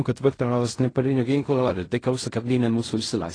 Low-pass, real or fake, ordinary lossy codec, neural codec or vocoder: 9.9 kHz; fake; AAC, 32 kbps; codec, 16 kHz in and 24 kHz out, 0.4 kbps, LongCat-Audio-Codec, fine tuned four codebook decoder